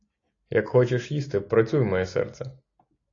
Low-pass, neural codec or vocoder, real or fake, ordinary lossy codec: 7.2 kHz; none; real; AAC, 32 kbps